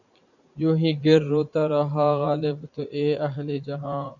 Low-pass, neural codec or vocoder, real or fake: 7.2 kHz; vocoder, 44.1 kHz, 80 mel bands, Vocos; fake